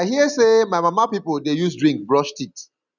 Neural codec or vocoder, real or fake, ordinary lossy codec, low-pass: none; real; none; 7.2 kHz